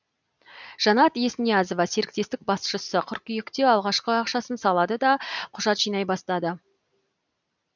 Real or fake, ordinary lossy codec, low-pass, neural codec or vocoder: real; none; 7.2 kHz; none